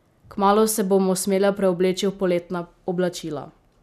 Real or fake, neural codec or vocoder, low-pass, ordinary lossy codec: real; none; 14.4 kHz; none